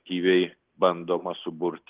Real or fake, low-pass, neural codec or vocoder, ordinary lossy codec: real; 3.6 kHz; none; Opus, 16 kbps